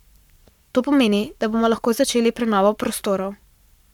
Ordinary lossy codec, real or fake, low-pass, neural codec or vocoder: none; fake; 19.8 kHz; codec, 44.1 kHz, 7.8 kbps, Pupu-Codec